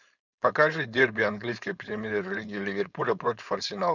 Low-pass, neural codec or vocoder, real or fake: 7.2 kHz; codec, 16 kHz, 4.8 kbps, FACodec; fake